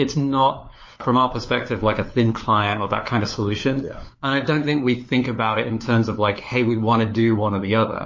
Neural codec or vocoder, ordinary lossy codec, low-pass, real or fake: codec, 16 kHz, 4 kbps, FunCodec, trained on LibriTTS, 50 frames a second; MP3, 32 kbps; 7.2 kHz; fake